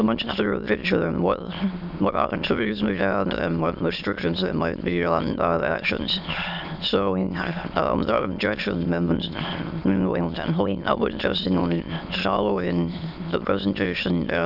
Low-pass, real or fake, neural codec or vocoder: 5.4 kHz; fake; autoencoder, 22.05 kHz, a latent of 192 numbers a frame, VITS, trained on many speakers